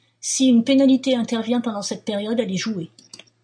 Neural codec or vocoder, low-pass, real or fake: none; 9.9 kHz; real